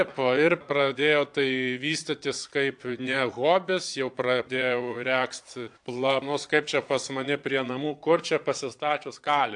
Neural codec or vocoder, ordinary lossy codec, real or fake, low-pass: vocoder, 22.05 kHz, 80 mel bands, Vocos; AAC, 64 kbps; fake; 9.9 kHz